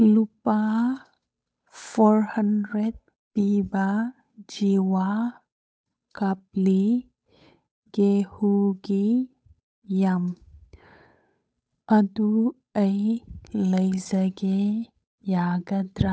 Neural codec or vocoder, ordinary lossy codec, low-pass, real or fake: codec, 16 kHz, 8 kbps, FunCodec, trained on Chinese and English, 25 frames a second; none; none; fake